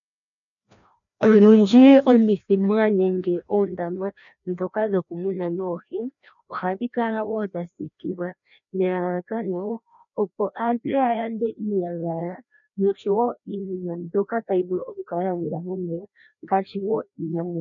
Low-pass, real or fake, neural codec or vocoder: 7.2 kHz; fake; codec, 16 kHz, 1 kbps, FreqCodec, larger model